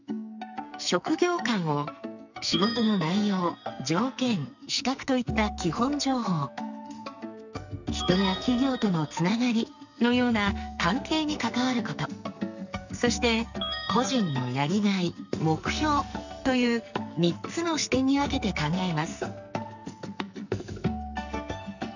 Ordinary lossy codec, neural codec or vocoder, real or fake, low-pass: none; codec, 44.1 kHz, 2.6 kbps, SNAC; fake; 7.2 kHz